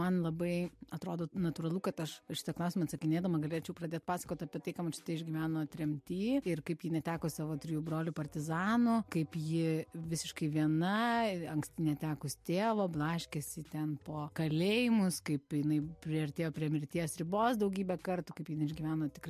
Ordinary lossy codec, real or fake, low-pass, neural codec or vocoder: MP3, 64 kbps; real; 14.4 kHz; none